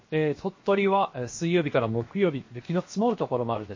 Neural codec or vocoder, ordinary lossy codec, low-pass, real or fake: codec, 16 kHz, 0.7 kbps, FocalCodec; MP3, 32 kbps; 7.2 kHz; fake